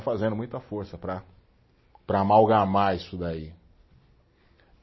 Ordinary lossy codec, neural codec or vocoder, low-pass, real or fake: MP3, 24 kbps; none; 7.2 kHz; real